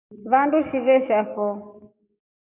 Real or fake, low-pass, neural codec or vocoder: fake; 3.6 kHz; codec, 44.1 kHz, 7.8 kbps, DAC